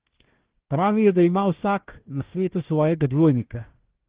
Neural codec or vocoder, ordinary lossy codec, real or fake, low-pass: codec, 16 kHz, 1 kbps, FunCodec, trained on Chinese and English, 50 frames a second; Opus, 16 kbps; fake; 3.6 kHz